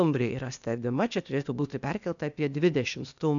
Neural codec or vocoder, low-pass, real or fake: codec, 16 kHz, 0.8 kbps, ZipCodec; 7.2 kHz; fake